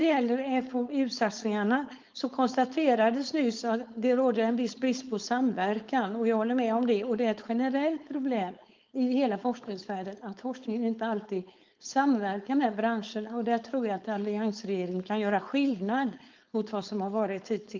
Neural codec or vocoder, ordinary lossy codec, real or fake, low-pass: codec, 16 kHz, 4.8 kbps, FACodec; Opus, 24 kbps; fake; 7.2 kHz